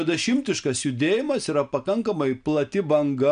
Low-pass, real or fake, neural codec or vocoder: 9.9 kHz; real; none